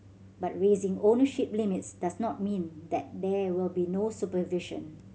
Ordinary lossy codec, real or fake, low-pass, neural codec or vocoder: none; real; none; none